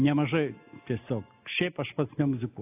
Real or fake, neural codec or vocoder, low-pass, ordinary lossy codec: real; none; 3.6 kHz; AAC, 24 kbps